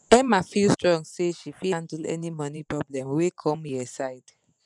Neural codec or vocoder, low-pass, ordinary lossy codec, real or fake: vocoder, 24 kHz, 100 mel bands, Vocos; 10.8 kHz; none; fake